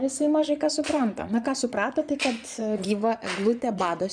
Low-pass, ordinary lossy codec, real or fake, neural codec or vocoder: 9.9 kHz; MP3, 96 kbps; fake; vocoder, 22.05 kHz, 80 mel bands, Vocos